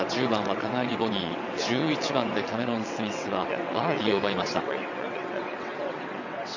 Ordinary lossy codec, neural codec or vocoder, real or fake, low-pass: none; vocoder, 22.05 kHz, 80 mel bands, WaveNeXt; fake; 7.2 kHz